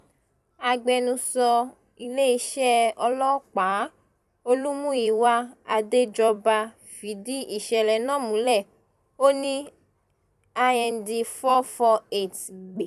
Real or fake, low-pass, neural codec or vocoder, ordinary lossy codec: fake; 14.4 kHz; vocoder, 44.1 kHz, 128 mel bands every 256 samples, BigVGAN v2; none